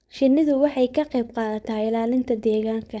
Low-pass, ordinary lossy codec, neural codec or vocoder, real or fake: none; none; codec, 16 kHz, 4.8 kbps, FACodec; fake